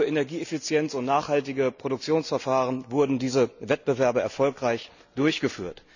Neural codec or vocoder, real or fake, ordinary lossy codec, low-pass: none; real; none; 7.2 kHz